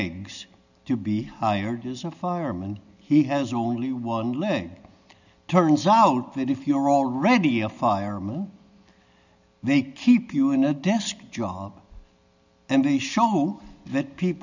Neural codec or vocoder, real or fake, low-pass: none; real; 7.2 kHz